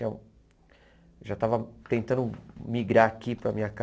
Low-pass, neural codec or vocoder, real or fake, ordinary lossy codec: none; none; real; none